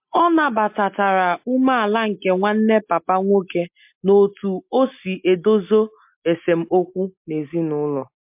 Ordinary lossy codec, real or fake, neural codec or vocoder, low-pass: MP3, 32 kbps; real; none; 3.6 kHz